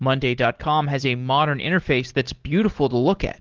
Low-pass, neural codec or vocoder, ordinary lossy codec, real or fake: 7.2 kHz; none; Opus, 16 kbps; real